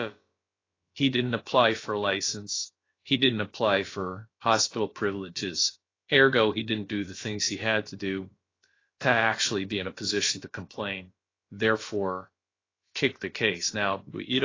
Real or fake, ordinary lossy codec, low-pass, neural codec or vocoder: fake; AAC, 32 kbps; 7.2 kHz; codec, 16 kHz, about 1 kbps, DyCAST, with the encoder's durations